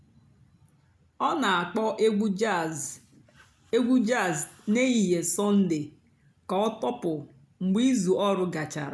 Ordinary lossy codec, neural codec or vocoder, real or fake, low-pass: none; none; real; none